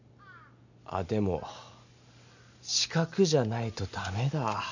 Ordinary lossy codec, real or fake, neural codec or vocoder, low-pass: none; real; none; 7.2 kHz